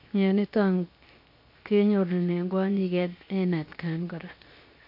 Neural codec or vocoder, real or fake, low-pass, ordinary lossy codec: codec, 16 kHz, 0.7 kbps, FocalCodec; fake; 5.4 kHz; MP3, 32 kbps